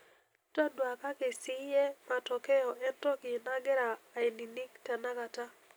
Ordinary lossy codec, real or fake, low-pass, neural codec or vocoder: none; real; none; none